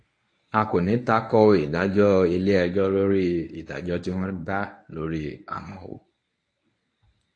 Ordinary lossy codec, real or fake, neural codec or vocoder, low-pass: AAC, 48 kbps; fake; codec, 24 kHz, 0.9 kbps, WavTokenizer, medium speech release version 1; 9.9 kHz